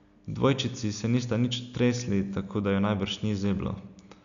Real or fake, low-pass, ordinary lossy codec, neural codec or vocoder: real; 7.2 kHz; none; none